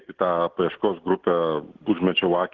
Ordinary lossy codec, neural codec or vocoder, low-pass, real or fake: Opus, 16 kbps; none; 7.2 kHz; real